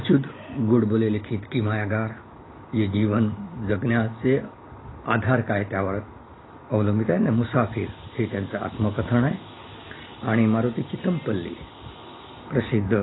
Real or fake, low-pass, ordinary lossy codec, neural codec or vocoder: real; 7.2 kHz; AAC, 16 kbps; none